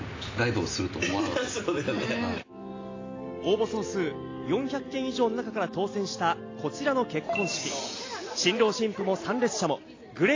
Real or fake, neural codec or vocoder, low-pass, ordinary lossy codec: real; none; 7.2 kHz; AAC, 32 kbps